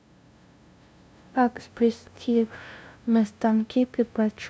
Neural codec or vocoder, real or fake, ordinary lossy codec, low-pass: codec, 16 kHz, 0.5 kbps, FunCodec, trained on LibriTTS, 25 frames a second; fake; none; none